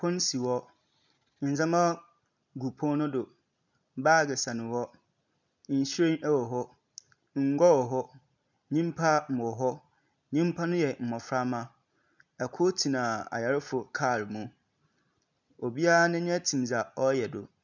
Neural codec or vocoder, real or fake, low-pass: none; real; 7.2 kHz